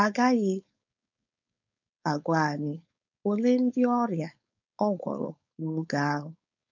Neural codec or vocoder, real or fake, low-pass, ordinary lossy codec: codec, 16 kHz, 4.8 kbps, FACodec; fake; 7.2 kHz; none